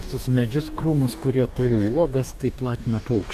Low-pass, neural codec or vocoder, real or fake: 14.4 kHz; codec, 44.1 kHz, 2.6 kbps, DAC; fake